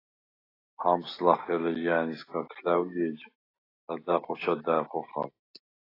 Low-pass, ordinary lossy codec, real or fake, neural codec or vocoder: 5.4 kHz; AAC, 24 kbps; real; none